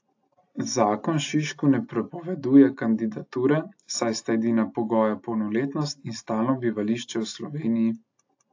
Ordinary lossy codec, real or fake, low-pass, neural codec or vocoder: AAC, 48 kbps; real; 7.2 kHz; none